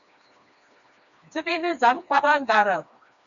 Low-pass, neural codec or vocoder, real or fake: 7.2 kHz; codec, 16 kHz, 2 kbps, FreqCodec, smaller model; fake